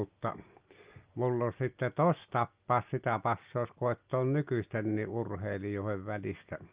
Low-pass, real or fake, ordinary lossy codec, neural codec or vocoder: 3.6 kHz; real; Opus, 24 kbps; none